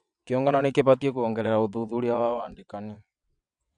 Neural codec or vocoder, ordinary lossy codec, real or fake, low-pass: vocoder, 22.05 kHz, 80 mel bands, WaveNeXt; none; fake; 9.9 kHz